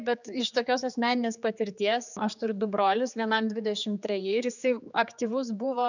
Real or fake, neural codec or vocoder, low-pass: fake; codec, 16 kHz, 4 kbps, X-Codec, HuBERT features, trained on general audio; 7.2 kHz